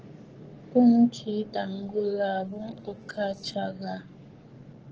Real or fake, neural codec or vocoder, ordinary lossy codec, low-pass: fake; codec, 44.1 kHz, 7.8 kbps, Pupu-Codec; Opus, 16 kbps; 7.2 kHz